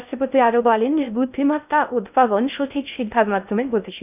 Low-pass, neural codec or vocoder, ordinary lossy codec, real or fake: 3.6 kHz; codec, 16 kHz in and 24 kHz out, 0.6 kbps, FocalCodec, streaming, 2048 codes; none; fake